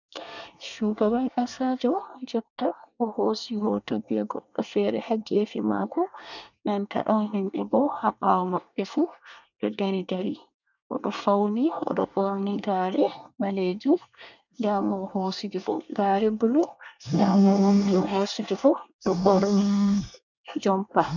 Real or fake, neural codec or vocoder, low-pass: fake; codec, 24 kHz, 1 kbps, SNAC; 7.2 kHz